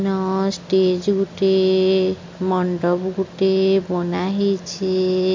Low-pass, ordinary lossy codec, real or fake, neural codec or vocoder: 7.2 kHz; MP3, 48 kbps; real; none